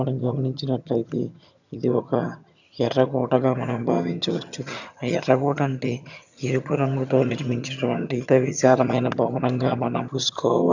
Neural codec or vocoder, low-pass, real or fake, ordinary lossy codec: vocoder, 22.05 kHz, 80 mel bands, HiFi-GAN; 7.2 kHz; fake; none